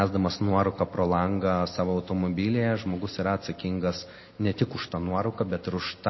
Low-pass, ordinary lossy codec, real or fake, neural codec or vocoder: 7.2 kHz; MP3, 24 kbps; real; none